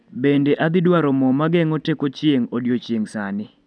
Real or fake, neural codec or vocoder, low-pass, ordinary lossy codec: real; none; none; none